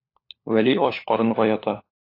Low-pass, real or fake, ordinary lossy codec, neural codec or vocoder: 5.4 kHz; fake; AAC, 32 kbps; codec, 16 kHz, 4 kbps, FunCodec, trained on LibriTTS, 50 frames a second